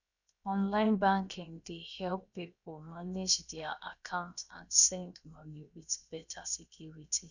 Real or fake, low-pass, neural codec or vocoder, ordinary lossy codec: fake; 7.2 kHz; codec, 16 kHz, 0.7 kbps, FocalCodec; none